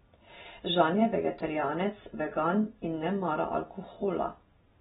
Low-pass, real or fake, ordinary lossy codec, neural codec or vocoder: 14.4 kHz; real; AAC, 16 kbps; none